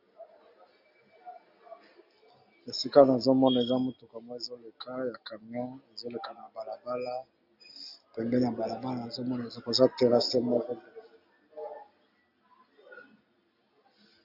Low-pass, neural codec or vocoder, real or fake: 5.4 kHz; none; real